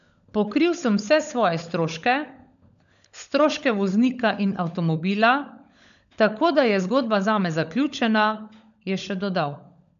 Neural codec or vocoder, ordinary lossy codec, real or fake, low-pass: codec, 16 kHz, 16 kbps, FunCodec, trained on LibriTTS, 50 frames a second; none; fake; 7.2 kHz